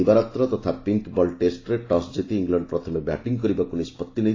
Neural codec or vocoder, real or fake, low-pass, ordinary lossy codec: none; real; 7.2 kHz; AAC, 32 kbps